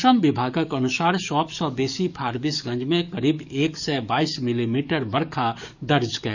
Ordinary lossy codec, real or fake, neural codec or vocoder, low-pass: none; fake; codec, 44.1 kHz, 7.8 kbps, DAC; 7.2 kHz